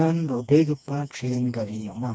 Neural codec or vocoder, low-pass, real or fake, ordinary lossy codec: codec, 16 kHz, 2 kbps, FreqCodec, smaller model; none; fake; none